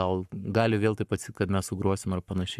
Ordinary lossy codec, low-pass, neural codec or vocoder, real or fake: MP3, 96 kbps; 14.4 kHz; codec, 44.1 kHz, 7.8 kbps, Pupu-Codec; fake